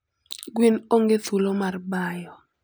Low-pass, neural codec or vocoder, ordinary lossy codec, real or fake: none; none; none; real